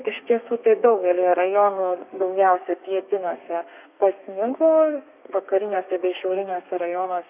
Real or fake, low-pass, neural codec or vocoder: fake; 3.6 kHz; codec, 32 kHz, 1.9 kbps, SNAC